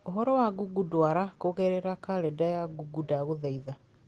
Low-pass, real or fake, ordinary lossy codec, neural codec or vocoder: 10.8 kHz; real; Opus, 16 kbps; none